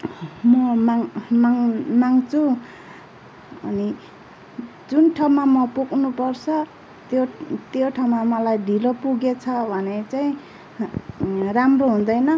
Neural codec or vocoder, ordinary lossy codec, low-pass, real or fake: none; none; none; real